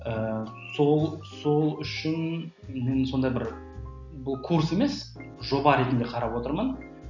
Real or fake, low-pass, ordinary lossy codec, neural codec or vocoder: real; 7.2 kHz; none; none